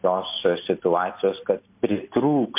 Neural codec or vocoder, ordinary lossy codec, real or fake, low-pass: none; MP3, 32 kbps; real; 3.6 kHz